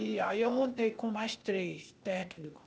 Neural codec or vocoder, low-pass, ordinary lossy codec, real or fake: codec, 16 kHz, 0.8 kbps, ZipCodec; none; none; fake